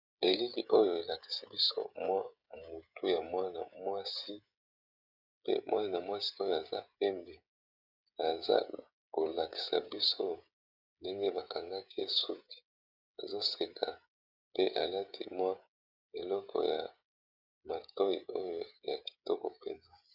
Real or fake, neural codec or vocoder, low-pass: fake; codec, 16 kHz, 16 kbps, FreqCodec, smaller model; 5.4 kHz